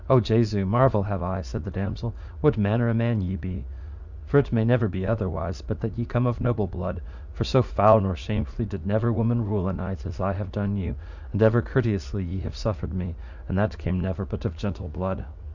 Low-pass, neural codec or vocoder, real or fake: 7.2 kHz; vocoder, 44.1 kHz, 80 mel bands, Vocos; fake